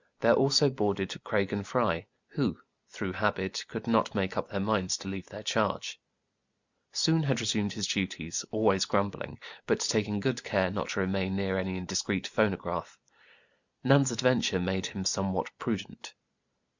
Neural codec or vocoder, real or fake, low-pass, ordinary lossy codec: none; real; 7.2 kHz; Opus, 64 kbps